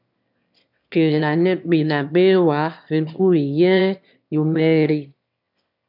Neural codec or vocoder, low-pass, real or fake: autoencoder, 22.05 kHz, a latent of 192 numbers a frame, VITS, trained on one speaker; 5.4 kHz; fake